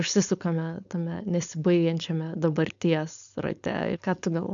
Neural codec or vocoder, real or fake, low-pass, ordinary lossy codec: codec, 16 kHz, 4.8 kbps, FACodec; fake; 7.2 kHz; MP3, 96 kbps